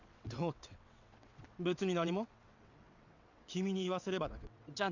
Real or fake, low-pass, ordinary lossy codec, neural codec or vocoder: fake; 7.2 kHz; none; vocoder, 22.05 kHz, 80 mel bands, WaveNeXt